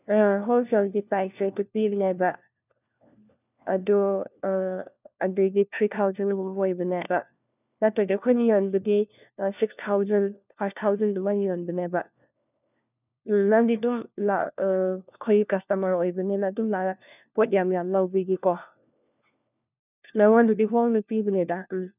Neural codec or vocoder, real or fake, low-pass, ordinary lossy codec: codec, 16 kHz, 1 kbps, FunCodec, trained on LibriTTS, 50 frames a second; fake; 3.6 kHz; none